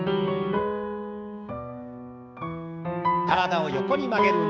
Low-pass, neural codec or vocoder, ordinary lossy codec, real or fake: none; codec, 16 kHz, 6 kbps, DAC; none; fake